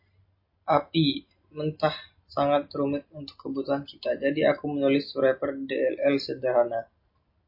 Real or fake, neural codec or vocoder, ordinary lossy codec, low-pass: real; none; MP3, 32 kbps; 5.4 kHz